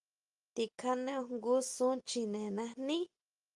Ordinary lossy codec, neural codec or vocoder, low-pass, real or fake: Opus, 32 kbps; none; 10.8 kHz; real